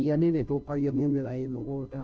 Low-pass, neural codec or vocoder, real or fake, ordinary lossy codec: none; codec, 16 kHz, 0.5 kbps, FunCodec, trained on Chinese and English, 25 frames a second; fake; none